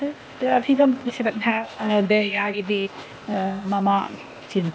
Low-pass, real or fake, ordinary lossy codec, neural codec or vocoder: none; fake; none; codec, 16 kHz, 0.8 kbps, ZipCodec